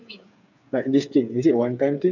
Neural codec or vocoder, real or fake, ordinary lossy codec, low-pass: codec, 16 kHz, 8 kbps, FreqCodec, smaller model; fake; none; 7.2 kHz